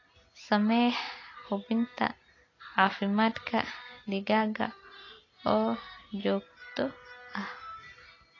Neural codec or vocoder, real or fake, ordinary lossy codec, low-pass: none; real; AAC, 32 kbps; 7.2 kHz